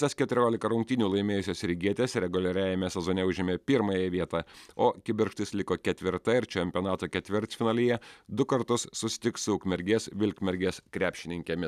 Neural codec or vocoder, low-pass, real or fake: none; 14.4 kHz; real